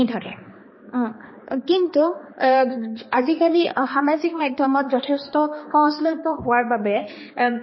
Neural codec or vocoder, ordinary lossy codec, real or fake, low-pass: codec, 16 kHz, 2 kbps, X-Codec, HuBERT features, trained on balanced general audio; MP3, 24 kbps; fake; 7.2 kHz